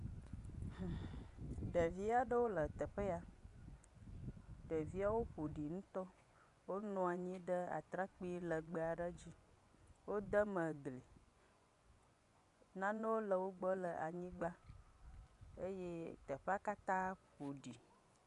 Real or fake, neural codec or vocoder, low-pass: fake; vocoder, 24 kHz, 100 mel bands, Vocos; 10.8 kHz